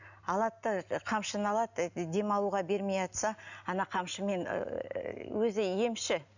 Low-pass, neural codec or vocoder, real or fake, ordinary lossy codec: 7.2 kHz; none; real; none